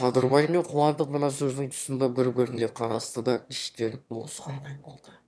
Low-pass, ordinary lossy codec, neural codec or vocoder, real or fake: none; none; autoencoder, 22.05 kHz, a latent of 192 numbers a frame, VITS, trained on one speaker; fake